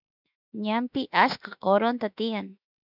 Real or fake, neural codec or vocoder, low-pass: fake; autoencoder, 48 kHz, 32 numbers a frame, DAC-VAE, trained on Japanese speech; 5.4 kHz